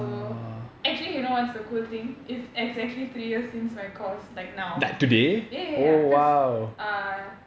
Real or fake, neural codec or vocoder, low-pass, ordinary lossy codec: real; none; none; none